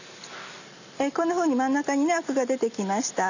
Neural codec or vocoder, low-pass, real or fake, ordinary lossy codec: none; 7.2 kHz; real; none